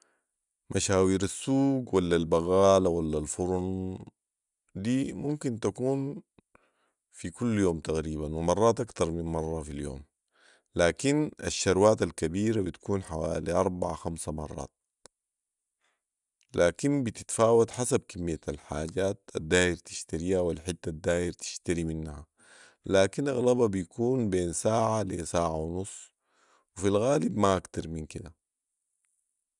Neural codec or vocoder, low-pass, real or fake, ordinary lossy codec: none; 10.8 kHz; real; none